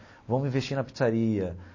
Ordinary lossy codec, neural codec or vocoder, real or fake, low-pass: MP3, 32 kbps; none; real; 7.2 kHz